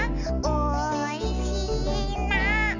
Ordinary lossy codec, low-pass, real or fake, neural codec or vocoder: none; 7.2 kHz; real; none